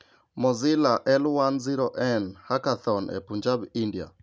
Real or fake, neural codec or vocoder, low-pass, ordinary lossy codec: real; none; none; none